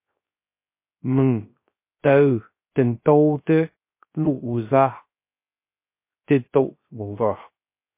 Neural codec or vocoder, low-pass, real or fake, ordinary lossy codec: codec, 16 kHz, 0.3 kbps, FocalCodec; 3.6 kHz; fake; MP3, 24 kbps